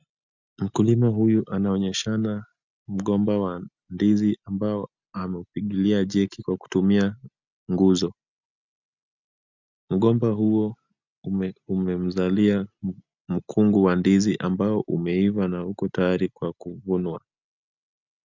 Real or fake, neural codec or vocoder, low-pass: real; none; 7.2 kHz